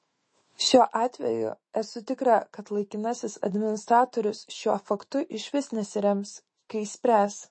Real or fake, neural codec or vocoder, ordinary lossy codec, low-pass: fake; autoencoder, 48 kHz, 128 numbers a frame, DAC-VAE, trained on Japanese speech; MP3, 32 kbps; 9.9 kHz